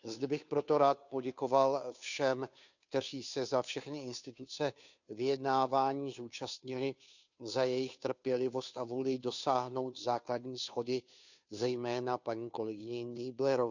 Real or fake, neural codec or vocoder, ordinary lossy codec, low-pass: fake; codec, 16 kHz, 2 kbps, FunCodec, trained on Chinese and English, 25 frames a second; none; 7.2 kHz